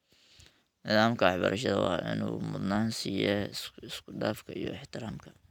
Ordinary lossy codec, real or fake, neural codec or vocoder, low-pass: none; real; none; 19.8 kHz